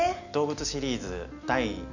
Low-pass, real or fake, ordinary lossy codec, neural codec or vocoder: 7.2 kHz; real; none; none